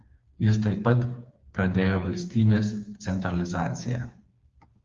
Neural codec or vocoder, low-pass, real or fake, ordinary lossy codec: codec, 16 kHz, 4 kbps, FreqCodec, smaller model; 7.2 kHz; fake; Opus, 32 kbps